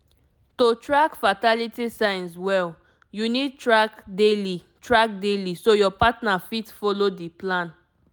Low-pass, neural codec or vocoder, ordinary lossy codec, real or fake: none; none; none; real